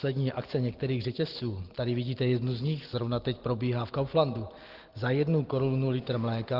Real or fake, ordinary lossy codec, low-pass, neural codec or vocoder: real; Opus, 16 kbps; 5.4 kHz; none